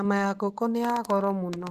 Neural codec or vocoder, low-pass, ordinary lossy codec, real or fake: none; 14.4 kHz; Opus, 24 kbps; real